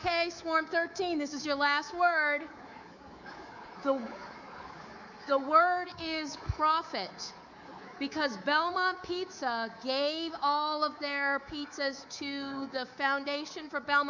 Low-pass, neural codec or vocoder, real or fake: 7.2 kHz; codec, 24 kHz, 3.1 kbps, DualCodec; fake